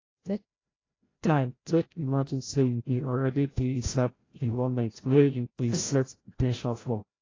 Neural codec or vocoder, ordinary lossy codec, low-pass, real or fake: codec, 16 kHz, 0.5 kbps, FreqCodec, larger model; AAC, 32 kbps; 7.2 kHz; fake